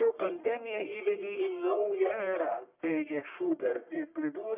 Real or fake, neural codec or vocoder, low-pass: fake; codec, 44.1 kHz, 1.7 kbps, Pupu-Codec; 3.6 kHz